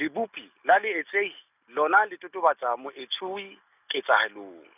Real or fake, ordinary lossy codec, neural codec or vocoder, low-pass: real; none; none; 3.6 kHz